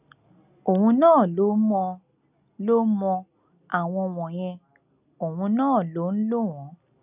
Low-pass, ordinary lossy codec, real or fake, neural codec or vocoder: 3.6 kHz; none; real; none